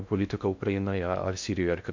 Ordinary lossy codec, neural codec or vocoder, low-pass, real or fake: MP3, 64 kbps; codec, 16 kHz in and 24 kHz out, 0.6 kbps, FocalCodec, streaming, 2048 codes; 7.2 kHz; fake